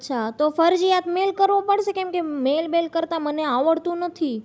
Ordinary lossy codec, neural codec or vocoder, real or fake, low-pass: none; none; real; none